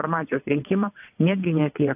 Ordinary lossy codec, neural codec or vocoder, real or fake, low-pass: AAC, 32 kbps; vocoder, 22.05 kHz, 80 mel bands, WaveNeXt; fake; 3.6 kHz